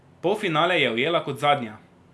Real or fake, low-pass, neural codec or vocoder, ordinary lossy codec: real; none; none; none